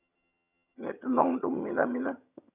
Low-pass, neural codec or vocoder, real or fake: 3.6 kHz; vocoder, 22.05 kHz, 80 mel bands, HiFi-GAN; fake